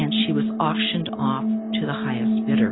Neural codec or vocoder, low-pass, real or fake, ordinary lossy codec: none; 7.2 kHz; real; AAC, 16 kbps